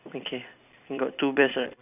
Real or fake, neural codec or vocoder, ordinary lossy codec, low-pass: fake; vocoder, 44.1 kHz, 128 mel bands every 256 samples, BigVGAN v2; none; 3.6 kHz